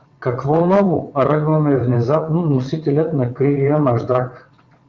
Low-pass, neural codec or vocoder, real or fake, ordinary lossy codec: 7.2 kHz; vocoder, 22.05 kHz, 80 mel bands, WaveNeXt; fake; Opus, 24 kbps